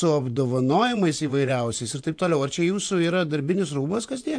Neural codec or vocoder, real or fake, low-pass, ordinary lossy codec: vocoder, 24 kHz, 100 mel bands, Vocos; fake; 9.9 kHz; AAC, 64 kbps